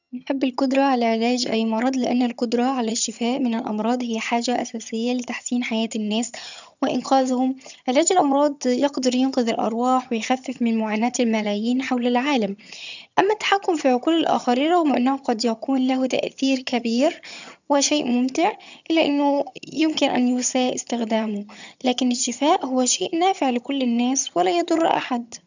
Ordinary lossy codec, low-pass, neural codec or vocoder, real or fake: none; 7.2 kHz; vocoder, 22.05 kHz, 80 mel bands, HiFi-GAN; fake